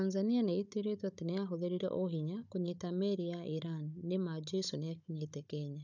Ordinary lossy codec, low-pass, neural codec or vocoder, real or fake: none; 7.2 kHz; codec, 16 kHz, 16 kbps, FunCodec, trained on Chinese and English, 50 frames a second; fake